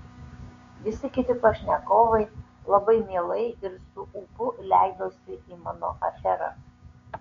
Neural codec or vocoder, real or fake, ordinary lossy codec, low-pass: codec, 16 kHz, 6 kbps, DAC; fake; MP3, 48 kbps; 7.2 kHz